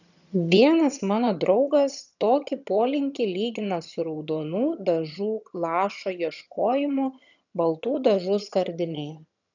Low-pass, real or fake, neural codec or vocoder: 7.2 kHz; fake; vocoder, 22.05 kHz, 80 mel bands, HiFi-GAN